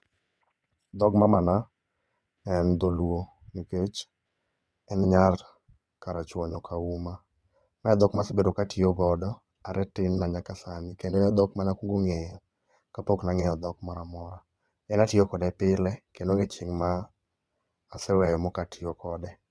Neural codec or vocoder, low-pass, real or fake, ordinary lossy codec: vocoder, 22.05 kHz, 80 mel bands, WaveNeXt; none; fake; none